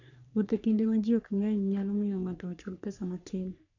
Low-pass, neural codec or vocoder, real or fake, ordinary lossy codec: 7.2 kHz; codec, 44.1 kHz, 3.4 kbps, Pupu-Codec; fake; none